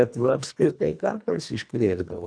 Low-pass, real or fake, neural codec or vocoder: 9.9 kHz; fake; codec, 24 kHz, 1.5 kbps, HILCodec